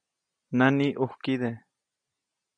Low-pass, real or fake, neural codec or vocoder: 9.9 kHz; real; none